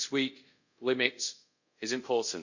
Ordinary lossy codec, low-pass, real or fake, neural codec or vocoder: none; 7.2 kHz; fake; codec, 24 kHz, 0.5 kbps, DualCodec